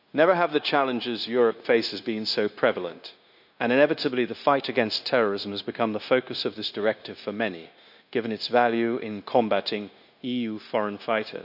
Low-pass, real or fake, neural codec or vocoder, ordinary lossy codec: 5.4 kHz; fake; codec, 16 kHz, 0.9 kbps, LongCat-Audio-Codec; AAC, 48 kbps